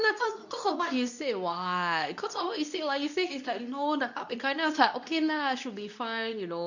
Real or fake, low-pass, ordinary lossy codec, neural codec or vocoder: fake; 7.2 kHz; none; codec, 24 kHz, 0.9 kbps, WavTokenizer, medium speech release version 2